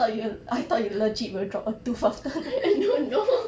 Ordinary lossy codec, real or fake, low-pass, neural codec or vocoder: none; real; none; none